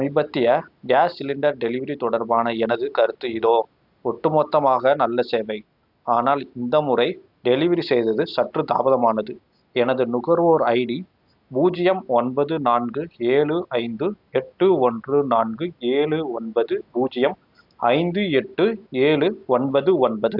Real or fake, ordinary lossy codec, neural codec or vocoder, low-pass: real; AAC, 48 kbps; none; 5.4 kHz